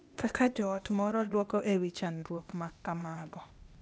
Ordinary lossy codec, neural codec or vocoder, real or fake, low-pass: none; codec, 16 kHz, 0.8 kbps, ZipCodec; fake; none